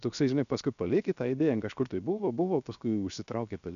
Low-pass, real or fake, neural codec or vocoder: 7.2 kHz; fake; codec, 16 kHz, 0.7 kbps, FocalCodec